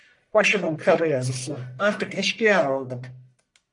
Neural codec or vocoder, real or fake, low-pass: codec, 44.1 kHz, 1.7 kbps, Pupu-Codec; fake; 10.8 kHz